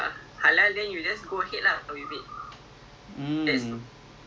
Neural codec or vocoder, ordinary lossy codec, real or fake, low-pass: none; none; real; none